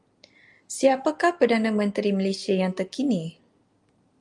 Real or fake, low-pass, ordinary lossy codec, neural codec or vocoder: real; 10.8 kHz; Opus, 32 kbps; none